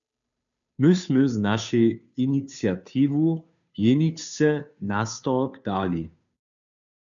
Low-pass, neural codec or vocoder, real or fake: 7.2 kHz; codec, 16 kHz, 2 kbps, FunCodec, trained on Chinese and English, 25 frames a second; fake